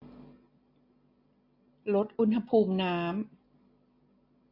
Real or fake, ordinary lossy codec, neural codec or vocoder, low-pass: real; AAC, 32 kbps; none; 5.4 kHz